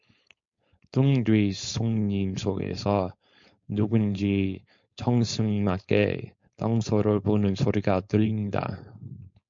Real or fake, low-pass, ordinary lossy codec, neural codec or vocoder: fake; 7.2 kHz; MP3, 48 kbps; codec, 16 kHz, 4.8 kbps, FACodec